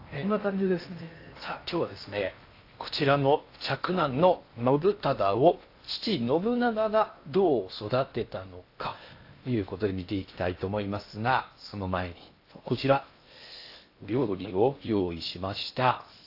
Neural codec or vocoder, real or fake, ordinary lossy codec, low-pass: codec, 16 kHz in and 24 kHz out, 0.8 kbps, FocalCodec, streaming, 65536 codes; fake; AAC, 32 kbps; 5.4 kHz